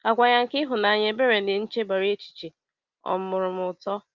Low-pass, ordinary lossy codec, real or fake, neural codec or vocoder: 7.2 kHz; Opus, 32 kbps; real; none